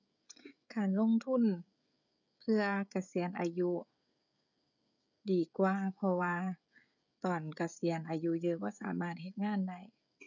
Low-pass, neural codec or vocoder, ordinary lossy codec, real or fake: 7.2 kHz; codec, 16 kHz, 16 kbps, FreqCodec, smaller model; none; fake